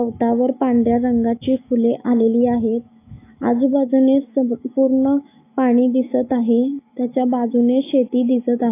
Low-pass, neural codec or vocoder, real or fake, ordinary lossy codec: 3.6 kHz; autoencoder, 48 kHz, 128 numbers a frame, DAC-VAE, trained on Japanese speech; fake; AAC, 24 kbps